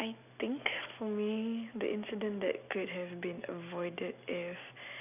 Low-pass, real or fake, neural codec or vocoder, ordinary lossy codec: 3.6 kHz; real; none; none